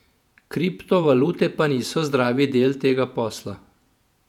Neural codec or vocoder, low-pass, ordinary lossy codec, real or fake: vocoder, 48 kHz, 128 mel bands, Vocos; 19.8 kHz; none; fake